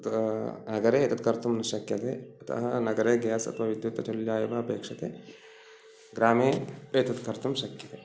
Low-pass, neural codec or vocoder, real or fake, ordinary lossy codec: none; none; real; none